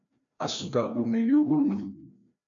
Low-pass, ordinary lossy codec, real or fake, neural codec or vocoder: 7.2 kHz; MP3, 64 kbps; fake; codec, 16 kHz, 1 kbps, FreqCodec, larger model